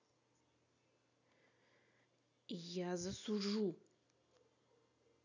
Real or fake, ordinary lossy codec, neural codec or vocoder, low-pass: real; none; none; 7.2 kHz